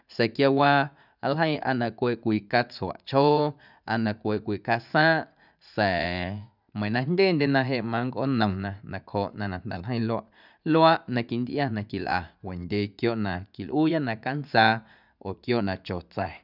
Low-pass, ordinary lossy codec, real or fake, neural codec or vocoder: 5.4 kHz; none; fake; vocoder, 44.1 kHz, 80 mel bands, Vocos